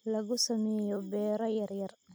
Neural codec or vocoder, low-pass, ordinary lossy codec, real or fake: none; none; none; real